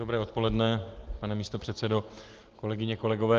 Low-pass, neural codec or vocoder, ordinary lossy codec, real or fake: 7.2 kHz; none; Opus, 16 kbps; real